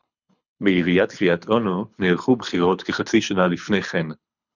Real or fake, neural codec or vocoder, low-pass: fake; codec, 24 kHz, 6 kbps, HILCodec; 7.2 kHz